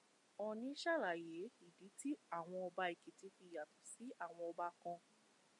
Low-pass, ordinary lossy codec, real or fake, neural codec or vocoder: 10.8 kHz; MP3, 64 kbps; real; none